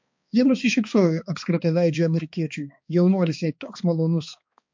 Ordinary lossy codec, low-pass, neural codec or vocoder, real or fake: MP3, 48 kbps; 7.2 kHz; codec, 16 kHz, 2 kbps, X-Codec, HuBERT features, trained on balanced general audio; fake